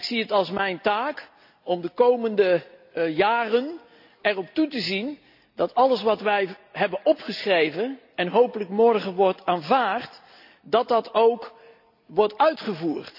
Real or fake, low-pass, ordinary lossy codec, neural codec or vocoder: real; 5.4 kHz; AAC, 48 kbps; none